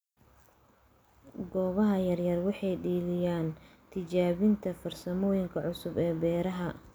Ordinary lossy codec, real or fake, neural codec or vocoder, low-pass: none; real; none; none